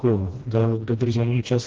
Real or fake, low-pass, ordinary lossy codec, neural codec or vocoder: fake; 7.2 kHz; Opus, 16 kbps; codec, 16 kHz, 1 kbps, FreqCodec, smaller model